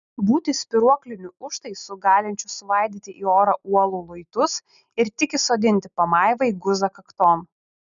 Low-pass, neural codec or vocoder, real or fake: 7.2 kHz; none; real